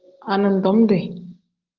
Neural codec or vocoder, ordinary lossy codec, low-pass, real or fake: none; Opus, 16 kbps; 7.2 kHz; real